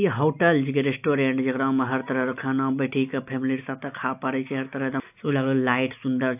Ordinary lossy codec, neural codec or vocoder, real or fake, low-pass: AAC, 32 kbps; none; real; 3.6 kHz